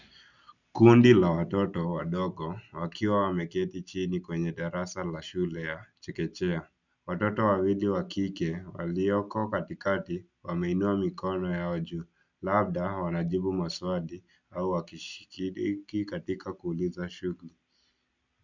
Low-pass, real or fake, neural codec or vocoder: 7.2 kHz; real; none